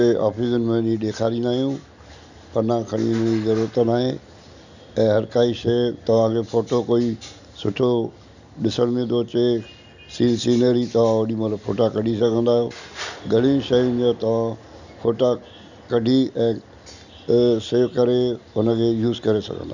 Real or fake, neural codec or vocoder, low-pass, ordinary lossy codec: real; none; 7.2 kHz; none